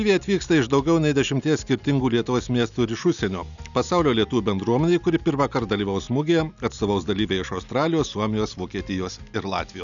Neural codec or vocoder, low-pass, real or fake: none; 7.2 kHz; real